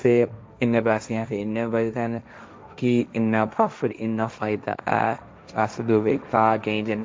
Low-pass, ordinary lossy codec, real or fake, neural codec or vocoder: none; none; fake; codec, 16 kHz, 1.1 kbps, Voila-Tokenizer